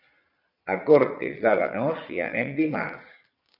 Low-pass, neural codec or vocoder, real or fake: 5.4 kHz; vocoder, 22.05 kHz, 80 mel bands, Vocos; fake